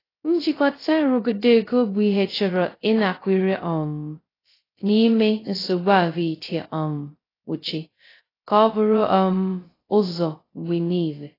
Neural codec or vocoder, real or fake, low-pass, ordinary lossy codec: codec, 16 kHz, 0.2 kbps, FocalCodec; fake; 5.4 kHz; AAC, 24 kbps